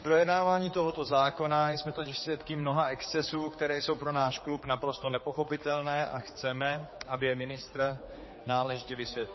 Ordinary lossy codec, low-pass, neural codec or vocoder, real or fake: MP3, 24 kbps; 7.2 kHz; codec, 16 kHz, 4 kbps, X-Codec, HuBERT features, trained on general audio; fake